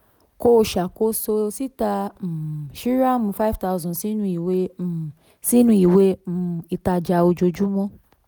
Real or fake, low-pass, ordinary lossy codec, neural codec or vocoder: real; none; none; none